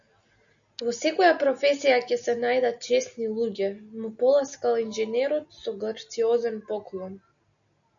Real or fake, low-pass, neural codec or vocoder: real; 7.2 kHz; none